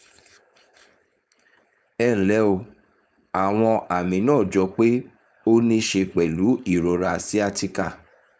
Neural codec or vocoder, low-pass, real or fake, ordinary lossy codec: codec, 16 kHz, 4.8 kbps, FACodec; none; fake; none